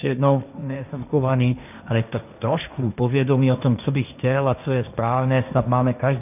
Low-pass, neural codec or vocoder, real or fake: 3.6 kHz; codec, 16 kHz, 1.1 kbps, Voila-Tokenizer; fake